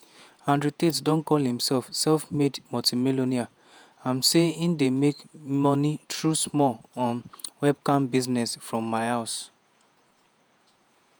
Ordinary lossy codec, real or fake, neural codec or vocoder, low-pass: none; fake; vocoder, 48 kHz, 128 mel bands, Vocos; none